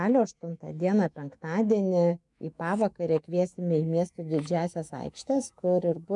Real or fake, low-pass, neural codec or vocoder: fake; 10.8 kHz; autoencoder, 48 kHz, 128 numbers a frame, DAC-VAE, trained on Japanese speech